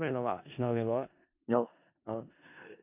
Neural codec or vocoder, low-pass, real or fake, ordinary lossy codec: codec, 16 kHz in and 24 kHz out, 0.4 kbps, LongCat-Audio-Codec, four codebook decoder; 3.6 kHz; fake; MP3, 32 kbps